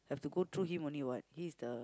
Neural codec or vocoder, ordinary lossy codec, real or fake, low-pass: none; none; real; none